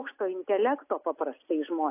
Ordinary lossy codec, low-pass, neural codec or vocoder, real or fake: AAC, 32 kbps; 3.6 kHz; none; real